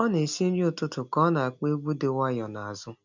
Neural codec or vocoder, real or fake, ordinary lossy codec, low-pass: none; real; MP3, 64 kbps; 7.2 kHz